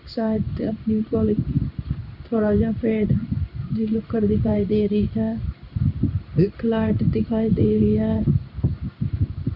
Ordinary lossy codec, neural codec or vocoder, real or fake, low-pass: none; codec, 16 kHz in and 24 kHz out, 1 kbps, XY-Tokenizer; fake; 5.4 kHz